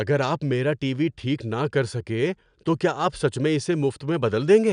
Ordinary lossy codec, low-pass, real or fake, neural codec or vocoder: none; 9.9 kHz; real; none